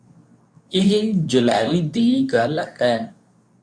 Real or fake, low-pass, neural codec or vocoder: fake; 9.9 kHz; codec, 24 kHz, 0.9 kbps, WavTokenizer, medium speech release version 2